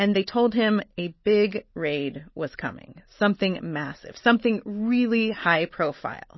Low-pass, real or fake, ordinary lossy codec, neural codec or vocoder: 7.2 kHz; real; MP3, 24 kbps; none